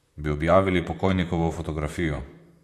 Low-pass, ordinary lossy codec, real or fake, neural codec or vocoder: 14.4 kHz; AAC, 64 kbps; fake; vocoder, 44.1 kHz, 128 mel bands, Pupu-Vocoder